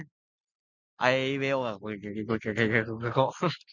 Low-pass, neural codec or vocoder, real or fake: 7.2 kHz; none; real